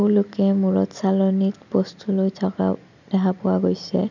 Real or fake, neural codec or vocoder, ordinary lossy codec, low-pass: real; none; none; 7.2 kHz